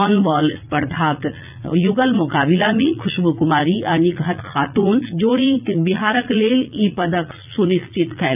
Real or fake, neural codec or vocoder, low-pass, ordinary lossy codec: fake; vocoder, 44.1 kHz, 80 mel bands, Vocos; 3.6 kHz; none